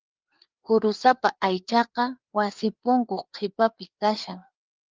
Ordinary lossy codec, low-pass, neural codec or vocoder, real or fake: Opus, 32 kbps; 7.2 kHz; codec, 16 kHz, 4 kbps, FreqCodec, larger model; fake